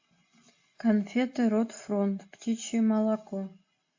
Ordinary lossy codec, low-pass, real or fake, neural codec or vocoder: AAC, 48 kbps; 7.2 kHz; real; none